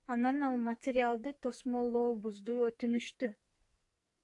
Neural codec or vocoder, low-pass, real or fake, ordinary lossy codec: codec, 44.1 kHz, 2.6 kbps, SNAC; 10.8 kHz; fake; AAC, 48 kbps